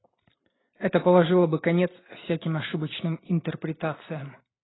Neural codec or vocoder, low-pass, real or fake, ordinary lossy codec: none; 7.2 kHz; real; AAC, 16 kbps